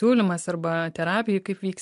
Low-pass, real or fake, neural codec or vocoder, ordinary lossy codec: 10.8 kHz; real; none; MP3, 64 kbps